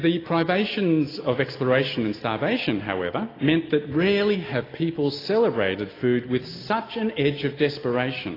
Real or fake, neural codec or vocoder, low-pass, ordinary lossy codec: real; none; 5.4 kHz; AAC, 24 kbps